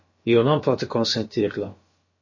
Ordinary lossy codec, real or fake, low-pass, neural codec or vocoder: MP3, 32 kbps; fake; 7.2 kHz; codec, 16 kHz, about 1 kbps, DyCAST, with the encoder's durations